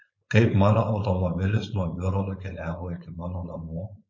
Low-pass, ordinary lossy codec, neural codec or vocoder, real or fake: 7.2 kHz; MP3, 32 kbps; codec, 16 kHz, 4.8 kbps, FACodec; fake